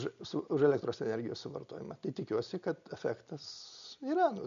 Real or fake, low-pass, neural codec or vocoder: real; 7.2 kHz; none